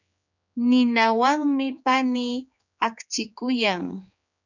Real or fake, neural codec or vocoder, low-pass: fake; codec, 16 kHz, 4 kbps, X-Codec, HuBERT features, trained on general audio; 7.2 kHz